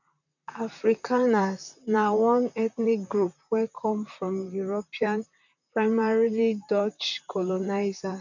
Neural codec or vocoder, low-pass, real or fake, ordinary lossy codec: vocoder, 44.1 kHz, 128 mel bands, Pupu-Vocoder; 7.2 kHz; fake; none